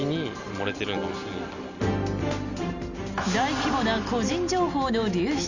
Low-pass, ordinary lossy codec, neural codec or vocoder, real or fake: 7.2 kHz; none; none; real